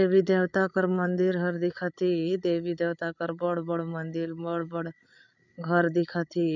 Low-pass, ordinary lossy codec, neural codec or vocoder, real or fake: 7.2 kHz; none; codec, 16 kHz, 8 kbps, FreqCodec, larger model; fake